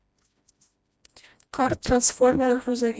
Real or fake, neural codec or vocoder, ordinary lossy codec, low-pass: fake; codec, 16 kHz, 1 kbps, FreqCodec, smaller model; none; none